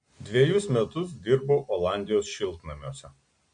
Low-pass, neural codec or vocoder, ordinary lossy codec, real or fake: 9.9 kHz; none; MP3, 48 kbps; real